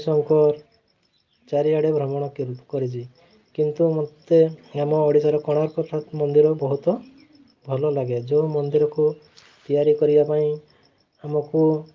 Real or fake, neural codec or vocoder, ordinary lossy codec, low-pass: real; none; Opus, 32 kbps; 7.2 kHz